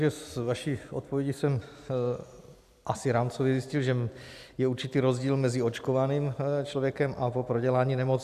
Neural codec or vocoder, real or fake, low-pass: none; real; 14.4 kHz